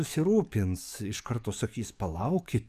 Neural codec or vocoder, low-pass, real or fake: codec, 44.1 kHz, 7.8 kbps, DAC; 14.4 kHz; fake